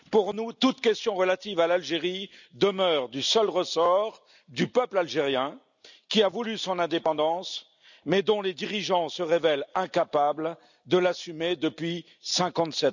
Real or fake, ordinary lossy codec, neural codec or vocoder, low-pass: real; none; none; 7.2 kHz